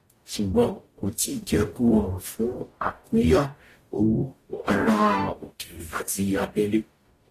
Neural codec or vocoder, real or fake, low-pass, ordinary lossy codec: codec, 44.1 kHz, 0.9 kbps, DAC; fake; 14.4 kHz; AAC, 48 kbps